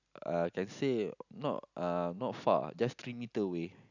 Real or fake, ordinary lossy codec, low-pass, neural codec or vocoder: real; none; 7.2 kHz; none